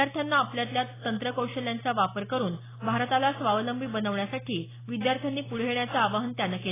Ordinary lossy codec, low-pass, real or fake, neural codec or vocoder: AAC, 16 kbps; 3.6 kHz; real; none